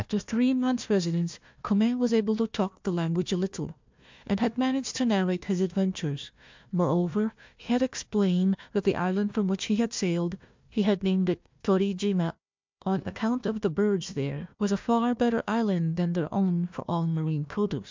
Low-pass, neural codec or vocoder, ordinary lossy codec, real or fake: 7.2 kHz; codec, 16 kHz, 1 kbps, FunCodec, trained on Chinese and English, 50 frames a second; MP3, 64 kbps; fake